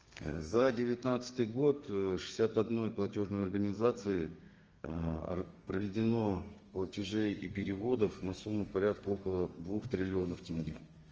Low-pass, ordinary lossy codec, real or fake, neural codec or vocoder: 7.2 kHz; Opus, 24 kbps; fake; codec, 32 kHz, 1.9 kbps, SNAC